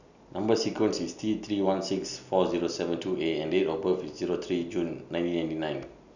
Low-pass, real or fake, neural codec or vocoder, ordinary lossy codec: 7.2 kHz; real; none; none